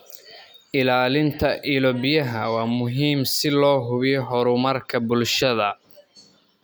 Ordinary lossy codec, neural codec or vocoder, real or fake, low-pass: none; none; real; none